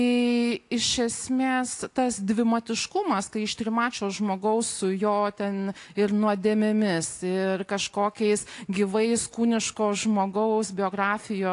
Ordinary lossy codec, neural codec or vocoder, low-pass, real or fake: AAC, 48 kbps; none; 10.8 kHz; real